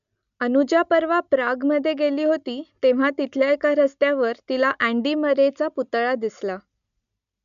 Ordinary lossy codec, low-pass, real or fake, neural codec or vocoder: MP3, 96 kbps; 7.2 kHz; real; none